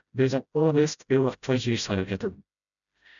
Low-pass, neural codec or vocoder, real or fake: 7.2 kHz; codec, 16 kHz, 0.5 kbps, FreqCodec, smaller model; fake